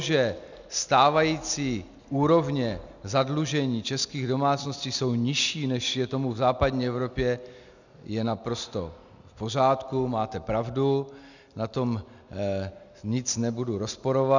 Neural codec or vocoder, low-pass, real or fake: none; 7.2 kHz; real